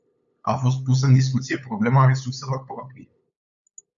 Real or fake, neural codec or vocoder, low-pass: fake; codec, 16 kHz, 8 kbps, FunCodec, trained on LibriTTS, 25 frames a second; 7.2 kHz